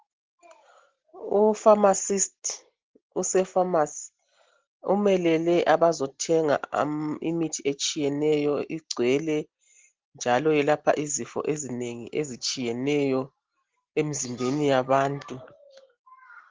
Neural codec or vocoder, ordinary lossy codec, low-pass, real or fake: none; Opus, 16 kbps; 7.2 kHz; real